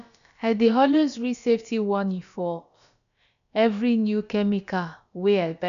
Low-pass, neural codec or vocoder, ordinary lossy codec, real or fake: 7.2 kHz; codec, 16 kHz, about 1 kbps, DyCAST, with the encoder's durations; none; fake